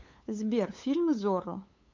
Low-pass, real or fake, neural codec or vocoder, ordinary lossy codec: 7.2 kHz; fake; codec, 16 kHz, 8 kbps, FunCodec, trained on LibriTTS, 25 frames a second; MP3, 48 kbps